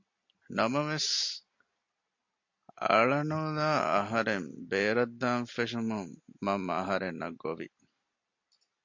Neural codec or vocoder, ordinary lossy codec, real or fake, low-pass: none; MP3, 48 kbps; real; 7.2 kHz